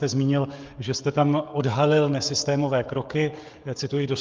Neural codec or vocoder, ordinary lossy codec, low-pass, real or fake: codec, 16 kHz, 16 kbps, FreqCodec, smaller model; Opus, 24 kbps; 7.2 kHz; fake